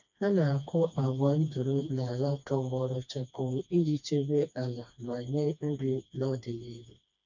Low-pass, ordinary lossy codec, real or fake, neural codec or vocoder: 7.2 kHz; none; fake; codec, 16 kHz, 2 kbps, FreqCodec, smaller model